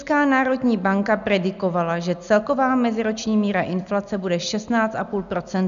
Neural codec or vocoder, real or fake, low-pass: none; real; 7.2 kHz